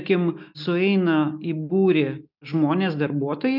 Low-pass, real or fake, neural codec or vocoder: 5.4 kHz; real; none